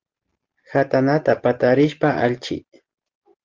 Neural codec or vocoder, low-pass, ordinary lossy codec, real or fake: none; 7.2 kHz; Opus, 16 kbps; real